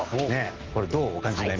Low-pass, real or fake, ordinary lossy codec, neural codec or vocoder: 7.2 kHz; real; Opus, 16 kbps; none